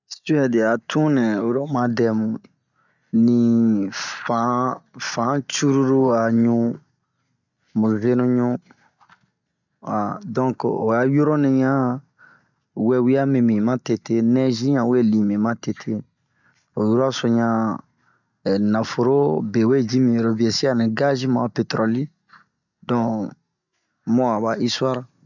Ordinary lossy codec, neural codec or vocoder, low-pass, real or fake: none; none; 7.2 kHz; real